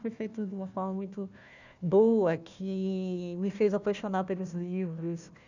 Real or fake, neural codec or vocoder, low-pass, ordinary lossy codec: fake; codec, 16 kHz, 1 kbps, FunCodec, trained on Chinese and English, 50 frames a second; 7.2 kHz; none